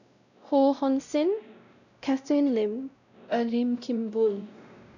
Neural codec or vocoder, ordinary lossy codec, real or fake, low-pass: codec, 16 kHz, 0.5 kbps, X-Codec, WavLM features, trained on Multilingual LibriSpeech; none; fake; 7.2 kHz